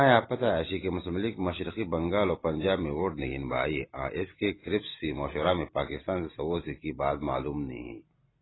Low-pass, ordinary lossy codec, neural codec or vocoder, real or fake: 7.2 kHz; AAC, 16 kbps; none; real